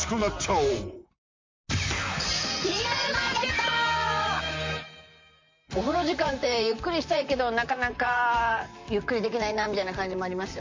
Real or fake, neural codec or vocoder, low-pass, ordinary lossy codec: fake; vocoder, 44.1 kHz, 128 mel bands, Pupu-Vocoder; 7.2 kHz; MP3, 48 kbps